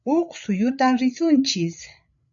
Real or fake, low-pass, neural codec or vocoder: fake; 7.2 kHz; codec, 16 kHz, 8 kbps, FreqCodec, larger model